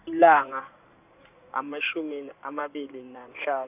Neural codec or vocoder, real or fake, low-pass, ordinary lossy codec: codec, 16 kHz in and 24 kHz out, 2.2 kbps, FireRedTTS-2 codec; fake; 3.6 kHz; none